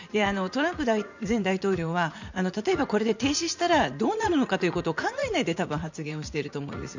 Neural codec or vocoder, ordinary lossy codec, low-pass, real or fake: vocoder, 44.1 kHz, 80 mel bands, Vocos; none; 7.2 kHz; fake